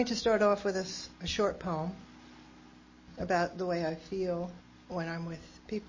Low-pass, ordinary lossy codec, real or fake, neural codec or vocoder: 7.2 kHz; MP3, 32 kbps; real; none